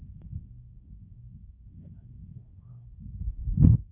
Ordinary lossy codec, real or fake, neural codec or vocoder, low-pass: none; fake; codec, 24 kHz, 0.9 kbps, WavTokenizer, medium speech release version 2; 3.6 kHz